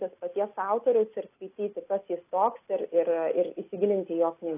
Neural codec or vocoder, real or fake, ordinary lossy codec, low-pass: none; real; AAC, 24 kbps; 3.6 kHz